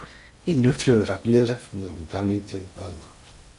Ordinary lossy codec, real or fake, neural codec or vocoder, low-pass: MP3, 64 kbps; fake; codec, 16 kHz in and 24 kHz out, 0.6 kbps, FocalCodec, streaming, 4096 codes; 10.8 kHz